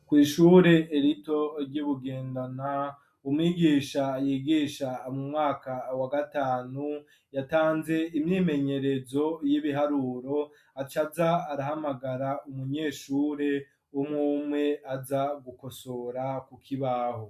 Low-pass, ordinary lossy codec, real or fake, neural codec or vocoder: 14.4 kHz; MP3, 96 kbps; real; none